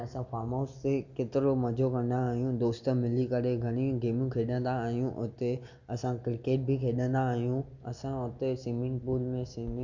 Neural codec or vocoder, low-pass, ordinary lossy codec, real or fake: none; 7.2 kHz; none; real